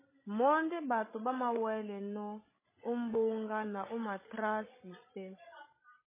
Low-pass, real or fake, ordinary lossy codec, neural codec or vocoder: 3.6 kHz; fake; MP3, 16 kbps; codec, 16 kHz, 16 kbps, FreqCodec, larger model